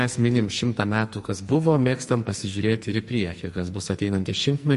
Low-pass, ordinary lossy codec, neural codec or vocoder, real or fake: 14.4 kHz; MP3, 48 kbps; codec, 44.1 kHz, 2.6 kbps, SNAC; fake